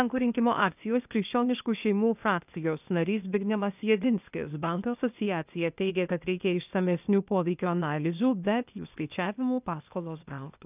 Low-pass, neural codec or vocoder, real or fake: 3.6 kHz; codec, 16 kHz, 0.8 kbps, ZipCodec; fake